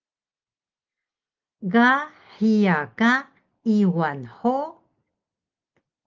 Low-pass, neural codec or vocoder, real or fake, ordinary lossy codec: 7.2 kHz; none; real; Opus, 32 kbps